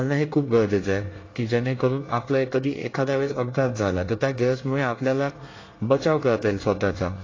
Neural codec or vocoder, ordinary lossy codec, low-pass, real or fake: codec, 24 kHz, 1 kbps, SNAC; AAC, 32 kbps; 7.2 kHz; fake